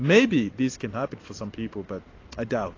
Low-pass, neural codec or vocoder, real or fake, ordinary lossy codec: 7.2 kHz; none; real; AAC, 32 kbps